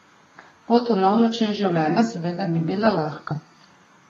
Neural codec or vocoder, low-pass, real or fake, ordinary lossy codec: codec, 32 kHz, 1.9 kbps, SNAC; 14.4 kHz; fake; AAC, 32 kbps